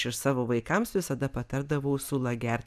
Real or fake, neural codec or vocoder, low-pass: real; none; 14.4 kHz